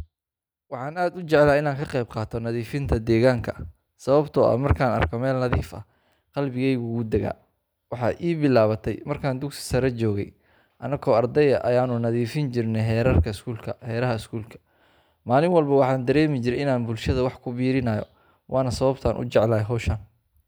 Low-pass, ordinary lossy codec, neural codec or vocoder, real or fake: none; none; none; real